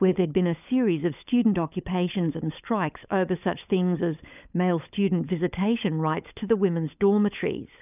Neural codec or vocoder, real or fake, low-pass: codec, 16 kHz, 16 kbps, FunCodec, trained on LibriTTS, 50 frames a second; fake; 3.6 kHz